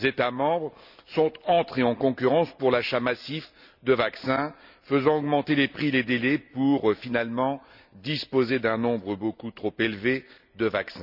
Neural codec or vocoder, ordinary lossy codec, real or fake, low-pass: none; none; real; 5.4 kHz